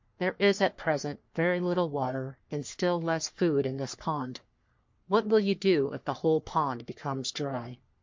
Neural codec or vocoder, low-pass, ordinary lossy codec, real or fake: codec, 44.1 kHz, 3.4 kbps, Pupu-Codec; 7.2 kHz; MP3, 48 kbps; fake